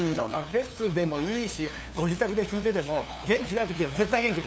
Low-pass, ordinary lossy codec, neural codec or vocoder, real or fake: none; none; codec, 16 kHz, 2 kbps, FunCodec, trained on LibriTTS, 25 frames a second; fake